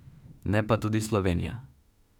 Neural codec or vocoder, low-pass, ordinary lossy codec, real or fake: autoencoder, 48 kHz, 32 numbers a frame, DAC-VAE, trained on Japanese speech; 19.8 kHz; none; fake